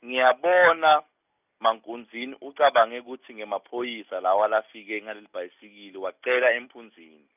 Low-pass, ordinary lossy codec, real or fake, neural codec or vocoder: 3.6 kHz; none; real; none